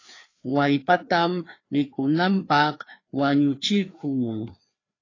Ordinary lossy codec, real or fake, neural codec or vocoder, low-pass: AAC, 32 kbps; fake; codec, 16 kHz, 2 kbps, FreqCodec, larger model; 7.2 kHz